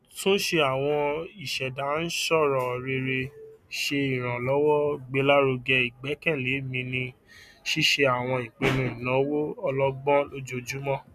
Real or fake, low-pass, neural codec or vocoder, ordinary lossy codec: real; 14.4 kHz; none; none